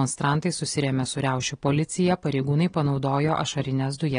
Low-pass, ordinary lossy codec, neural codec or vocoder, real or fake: 9.9 kHz; AAC, 32 kbps; none; real